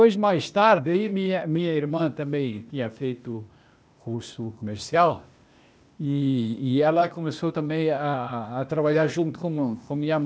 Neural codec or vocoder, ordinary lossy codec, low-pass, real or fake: codec, 16 kHz, 0.8 kbps, ZipCodec; none; none; fake